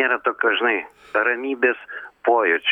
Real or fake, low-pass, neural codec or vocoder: real; 19.8 kHz; none